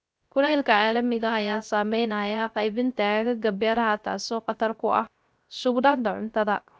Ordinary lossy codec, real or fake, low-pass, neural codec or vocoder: none; fake; none; codec, 16 kHz, 0.3 kbps, FocalCodec